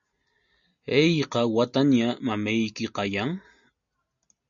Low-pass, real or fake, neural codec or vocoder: 7.2 kHz; real; none